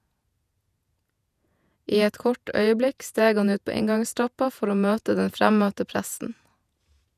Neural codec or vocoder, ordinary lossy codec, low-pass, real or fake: vocoder, 48 kHz, 128 mel bands, Vocos; none; 14.4 kHz; fake